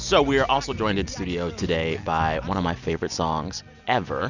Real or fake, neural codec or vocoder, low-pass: real; none; 7.2 kHz